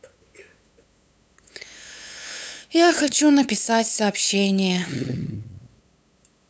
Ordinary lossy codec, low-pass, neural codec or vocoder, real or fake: none; none; codec, 16 kHz, 8 kbps, FunCodec, trained on LibriTTS, 25 frames a second; fake